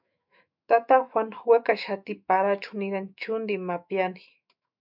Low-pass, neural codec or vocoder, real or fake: 5.4 kHz; autoencoder, 48 kHz, 128 numbers a frame, DAC-VAE, trained on Japanese speech; fake